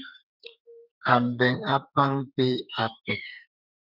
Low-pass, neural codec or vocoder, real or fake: 5.4 kHz; codec, 32 kHz, 1.9 kbps, SNAC; fake